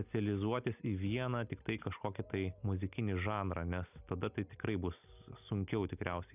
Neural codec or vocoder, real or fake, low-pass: none; real; 3.6 kHz